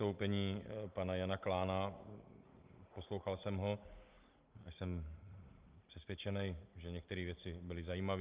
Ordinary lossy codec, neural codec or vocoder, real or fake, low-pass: Opus, 32 kbps; none; real; 3.6 kHz